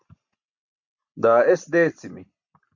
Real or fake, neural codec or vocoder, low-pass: real; none; 7.2 kHz